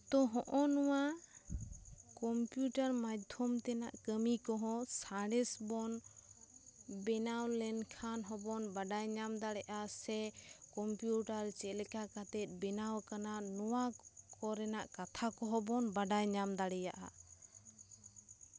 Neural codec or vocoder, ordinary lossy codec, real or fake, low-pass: none; none; real; none